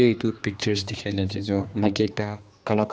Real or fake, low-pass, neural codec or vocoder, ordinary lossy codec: fake; none; codec, 16 kHz, 2 kbps, X-Codec, HuBERT features, trained on general audio; none